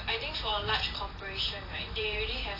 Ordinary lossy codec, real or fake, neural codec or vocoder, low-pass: AAC, 24 kbps; fake; vocoder, 44.1 kHz, 128 mel bands every 512 samples, BigVGAN v2; 5.4 kHz